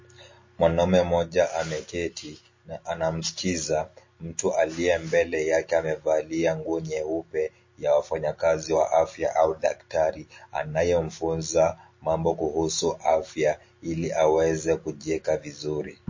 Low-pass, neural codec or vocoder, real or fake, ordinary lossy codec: 7.2 kHz; none; real; MP3, 32 kbps